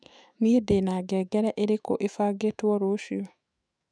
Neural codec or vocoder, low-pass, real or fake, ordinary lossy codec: autoencoder, 48 kHz, 128 numbers a frame, DAC-VAE, trained on Japanese speech; 9.9 kHz; fake; none